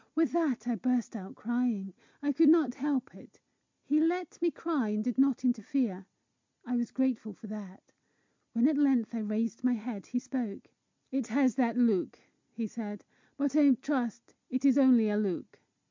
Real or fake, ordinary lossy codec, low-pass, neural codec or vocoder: real; MP3, 64 kbps; 7.2 kHz; none